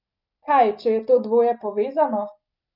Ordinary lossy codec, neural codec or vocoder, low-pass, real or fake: none; none; 5.4 kHz; real